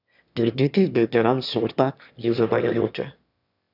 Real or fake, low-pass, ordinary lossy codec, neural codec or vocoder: fake; 5.4 kHz; none; autoencoder, 22.05 kHz, a latent of 192 numbers a frame, VITS, trained on one speaker